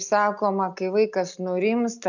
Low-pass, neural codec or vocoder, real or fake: 7.2 kHz; none; real